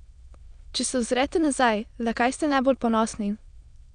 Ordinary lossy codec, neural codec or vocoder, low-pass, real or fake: none; autoencoder, 22.05 kHz, a latent of 192 numbers a frame, VITS, trained on many speakers; 9.9 kHz; fake